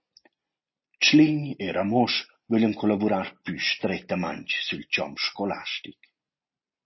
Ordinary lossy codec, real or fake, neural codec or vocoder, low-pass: MP3, 24 kbps; real; none; 7.2 kHz